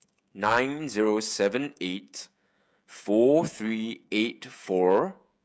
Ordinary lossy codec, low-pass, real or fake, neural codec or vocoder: none; none; fake; codec, 16 kHz, 16 kbps, FreqCodec, smaller model